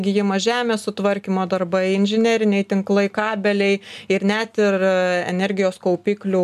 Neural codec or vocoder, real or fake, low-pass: none; real; 14.4 kHz